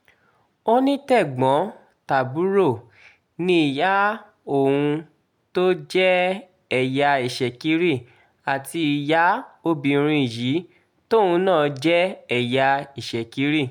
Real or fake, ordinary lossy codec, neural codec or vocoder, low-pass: real; none; none; 19.8 kHz